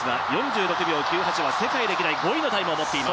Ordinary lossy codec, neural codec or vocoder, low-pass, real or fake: none; none; none; real